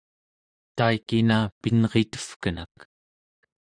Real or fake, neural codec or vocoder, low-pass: fake; vocoder, 44.1 kHz, 128 mel bands, Pupu-Vocoder; 9.9 kHz